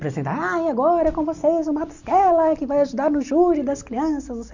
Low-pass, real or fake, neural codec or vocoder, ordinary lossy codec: 7.2 kHz; real; none; none